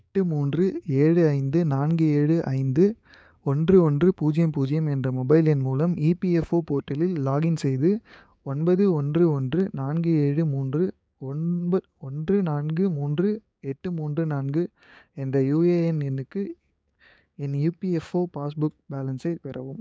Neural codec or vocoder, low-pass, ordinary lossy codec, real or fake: codec, 16 kHz, 6 kbps, DAC; none; none; fake